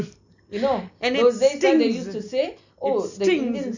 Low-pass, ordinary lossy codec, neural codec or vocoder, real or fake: 7.2 kHz; none; none; real